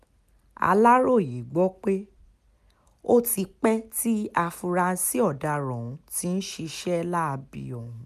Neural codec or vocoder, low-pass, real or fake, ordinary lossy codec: none; 14.4 kHz; real; none